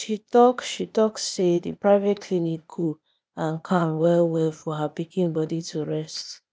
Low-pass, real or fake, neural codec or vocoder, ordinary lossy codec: none; fake; codec, 16 kHz, 0.8 kbps, ZipCodec; none